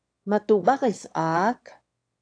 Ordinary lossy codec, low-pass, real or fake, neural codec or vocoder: AAC, 48 kbps; 9.9 kHz; fake; autoencoder, 22.05 kHz, a latent of 192 numbers a frame, VITS, trained on one speaker